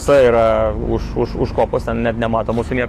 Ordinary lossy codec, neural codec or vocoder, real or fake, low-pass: AAC, 48 kbps; autoencoder, 48 kHz, 128 numbers a frame, DAC-VAE, trained on Japanese speech; fake; 14.4 kHz